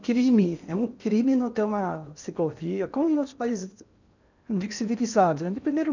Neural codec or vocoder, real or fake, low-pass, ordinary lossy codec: codec, 16 kHz in and 24 kHz out, 0.8 kbps, FocalCodec, streaming, 65536 codes; fake; 7.2 kHz; none